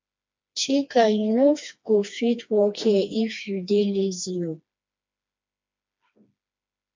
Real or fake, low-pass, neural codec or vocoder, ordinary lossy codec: fake; 7.2 kHz; codec, 16 kHz, 2 kbps, FreqCodec, smaller model; MP3, 64 kbps